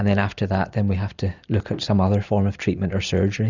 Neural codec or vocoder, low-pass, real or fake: none; 7.2 kHz; real